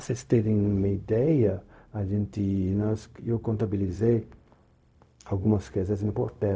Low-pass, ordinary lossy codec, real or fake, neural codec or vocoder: none; none; fake; codec, 16 kHz, 0.4 kbps, LongCat-Audio-Codec